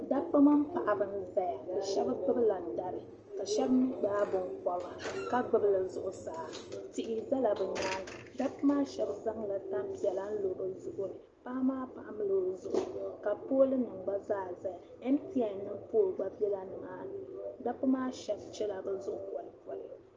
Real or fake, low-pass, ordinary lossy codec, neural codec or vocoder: real; 7.2 kHz; Opus, 24 kbps; none